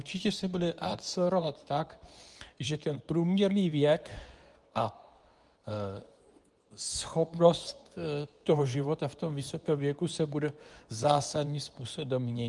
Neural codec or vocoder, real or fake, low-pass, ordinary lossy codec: codec, 24 kHz, 0.9 kbps, WavTokenizer, medium speech release version 2; fake; 10.8 kHz; Opus, 32 kbps